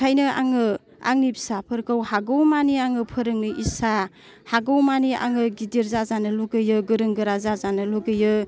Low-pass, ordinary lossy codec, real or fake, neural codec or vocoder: none; none; real; none